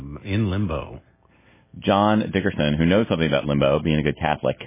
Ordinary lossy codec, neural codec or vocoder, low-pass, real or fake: MP3, 16 kbps; none; 3.6 kHz; real